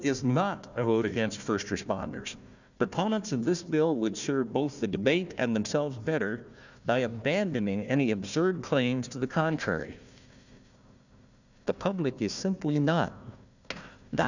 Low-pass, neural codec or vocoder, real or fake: 7.2 kHz; codec, 16 kHz, 1 kbps, FunCodec, trained on Chinese and English, 50 frames a second; fake